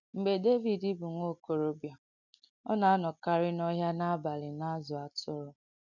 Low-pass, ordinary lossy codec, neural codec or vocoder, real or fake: 7.2 kHz; none; none; real